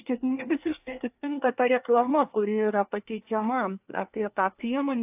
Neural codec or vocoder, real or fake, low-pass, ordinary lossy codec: codec, 24 kHz, 1 kbps, SNAC; fake; 3.6 kHz; AAC, 32 kbps